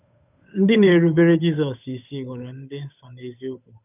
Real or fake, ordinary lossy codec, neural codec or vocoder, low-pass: fake; none; codec, 16 kHz, 8 kbps, FunCodec, trained on Chinese and English, 25 frames a second; 3.6 kHz